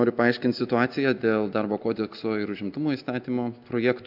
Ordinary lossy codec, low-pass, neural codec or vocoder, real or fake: AAC, 48 kbps; 5.4 kHz; none; real